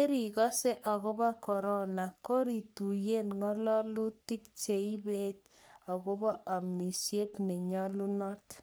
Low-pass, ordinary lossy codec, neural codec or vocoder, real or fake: none; none; codec, 44.1 kHz, 3.4 kbps, Pupu-Codec; fake